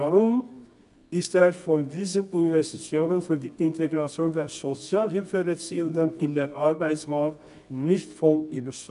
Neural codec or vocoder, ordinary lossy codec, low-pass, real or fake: codec, 24 kHz, 0.9 kbps, WavTokenizer, medium music audio release; none; 10.8 kHz; fake